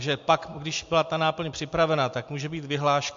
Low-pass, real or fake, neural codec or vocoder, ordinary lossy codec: 7.2 kHz; real; none; MP3, 48 kbps